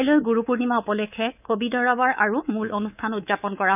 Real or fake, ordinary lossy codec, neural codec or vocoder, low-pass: fake; none; codec, 24 kHz, 3.1 kbps, DualCodec; 3.6 kHz